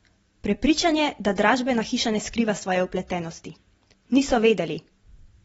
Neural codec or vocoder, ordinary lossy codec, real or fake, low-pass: none; AAC, 24 kbps; real; 19.8 kHz